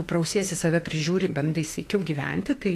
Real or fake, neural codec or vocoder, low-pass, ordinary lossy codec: fake; autoencoder, 48 kHz, 32 numbers a frame, DAC-VAE, trained on Japanese speech; 14.4 kHz; AAC, 48 kbps